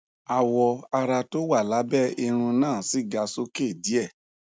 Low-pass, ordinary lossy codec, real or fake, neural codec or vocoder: none; none; real; none